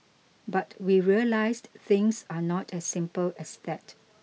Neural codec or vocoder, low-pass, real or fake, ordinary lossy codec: none; none; real; none